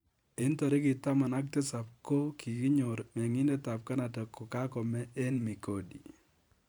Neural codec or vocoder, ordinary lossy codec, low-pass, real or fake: vocoder, 44.1 kHz, 128 mel bands every 512 samples, BigVGAN v2; none; none; fake